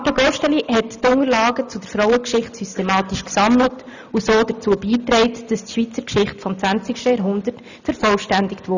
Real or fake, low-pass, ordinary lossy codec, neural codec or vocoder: real; 7.2 kHz; none; none